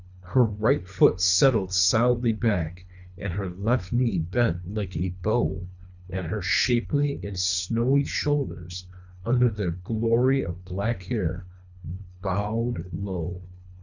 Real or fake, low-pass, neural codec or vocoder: fake; 7.2 kHz; codec, 24 kHz, 3 kbps, HILCodec